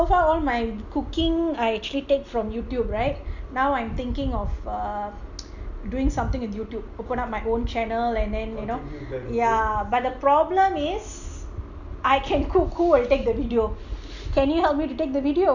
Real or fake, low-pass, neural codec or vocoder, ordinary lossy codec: real; 7.2 kHz; none; none